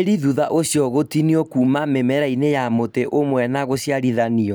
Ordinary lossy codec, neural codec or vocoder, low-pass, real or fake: none; none; none; real